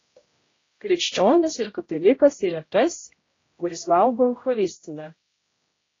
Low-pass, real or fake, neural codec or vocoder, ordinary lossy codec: 7.2 kHz; fake; codec, 16 kHz, 0.5 kbps, X-Codec, HuBERT features, trained on general audio; AAC, 32 kbps